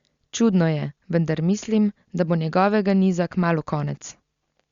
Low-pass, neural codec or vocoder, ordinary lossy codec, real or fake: 7.2 kHz; none; Opus, 64 kbps; real